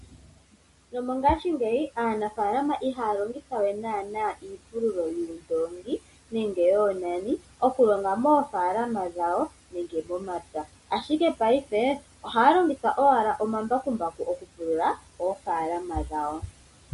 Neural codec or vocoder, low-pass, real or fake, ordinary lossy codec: none; 14.4 kHz; real; MP3, 48 kbps